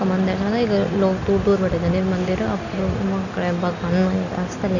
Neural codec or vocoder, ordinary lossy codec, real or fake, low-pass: none; none; real; 7.2 kHz